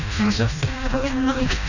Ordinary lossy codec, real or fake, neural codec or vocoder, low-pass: none; fake; codec, 16 kHz, 1 kbps, FreqCodec, smaller model; 7.2 kHz